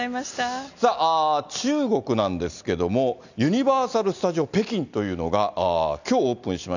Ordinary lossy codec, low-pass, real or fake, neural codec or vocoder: none; 7.2 kHz; real; none